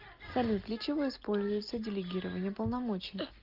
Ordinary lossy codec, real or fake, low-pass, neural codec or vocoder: Opus, 24 kbps; real; 5.4 kHz; none